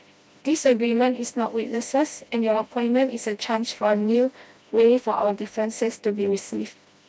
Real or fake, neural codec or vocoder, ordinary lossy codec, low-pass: fake; codec, 16 kHz, 1 kbps, FreqCodec, smaller model; none; none